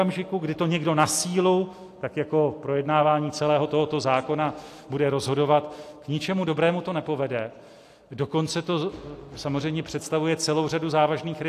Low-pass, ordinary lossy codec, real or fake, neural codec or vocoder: 14.4 kHz; AAC, 64 kbps; real; none